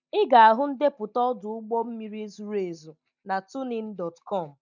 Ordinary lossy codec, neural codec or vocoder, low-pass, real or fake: none; none; 7.2 kHz; real